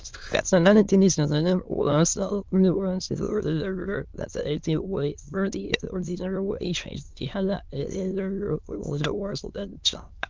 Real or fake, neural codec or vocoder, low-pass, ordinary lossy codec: fake; autoencoder, 22.05 kHz, a latent of 192 numbers a frame, VITS, trained on many speakers; 7.2 kHz; Opus, 24 kbps